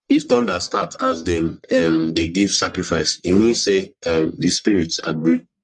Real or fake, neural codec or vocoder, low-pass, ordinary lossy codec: fake; codec, 44.1 kHz, 1.7 kbps, Pupu-Codec; 10.8 kHz; MP3, 64 kbps